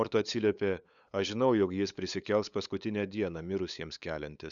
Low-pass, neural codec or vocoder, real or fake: 7.2 kHz; codec, 16 kHz, 8 kbps, FunCodec, trained on LibriTTS, 25 frames a second; fake